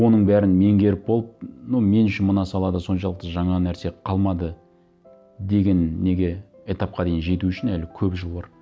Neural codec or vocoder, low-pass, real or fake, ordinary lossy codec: none; none; real; none